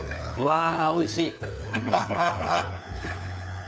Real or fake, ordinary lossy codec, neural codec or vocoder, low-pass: fake; none; codec, 16 kHz, 4 kbps, FunCodec, trained on LibriTTS, 50 frames a second; none